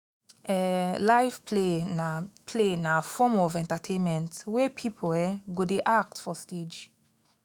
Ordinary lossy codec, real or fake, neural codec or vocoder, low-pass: none; fake; autoencoder, 48 kHz, 128 numbers a frame, DAC-VAE, trained on Japanese speech; none